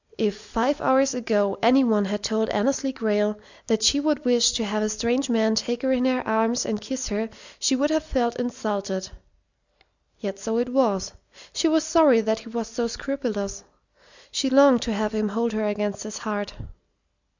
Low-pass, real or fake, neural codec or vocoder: 7.2 kHz; real; none